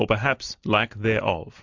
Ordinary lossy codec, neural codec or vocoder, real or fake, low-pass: MP3, 64 kbps; none; real; 7.2 kHz